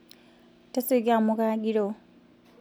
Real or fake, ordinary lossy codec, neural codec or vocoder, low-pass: real; none; none; none